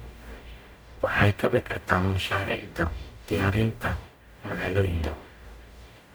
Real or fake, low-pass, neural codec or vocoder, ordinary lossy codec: fake; none; codec, 44.1 kHz, 0.9 kbps, DAC; none